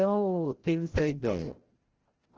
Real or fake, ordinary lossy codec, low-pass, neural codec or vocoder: fake; Opus, 16 kbps; 7.2 kHz; codec, 16 kHz, 0.5 kbps, FreqCodec, larger model